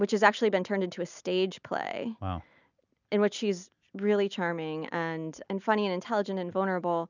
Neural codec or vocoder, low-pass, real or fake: none; 7.2 kHz; real